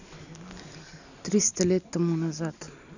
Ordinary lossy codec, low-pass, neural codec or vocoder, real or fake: none; 7.2 kHz; none; real